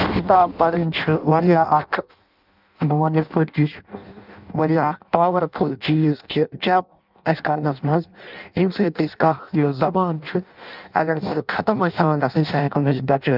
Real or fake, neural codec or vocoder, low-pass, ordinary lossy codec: fake; codec, 16 kHz in and 24 kHz out, 0.6 kbps, FireRedTTS-2 codec; 5.4 kHz; none